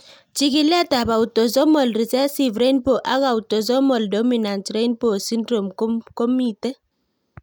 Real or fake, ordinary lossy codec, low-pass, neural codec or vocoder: real; none; none; none